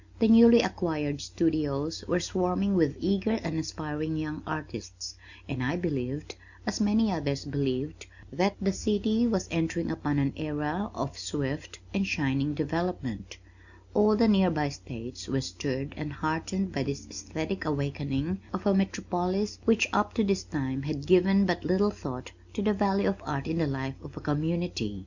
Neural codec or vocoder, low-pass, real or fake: none; 7.2 kHz; real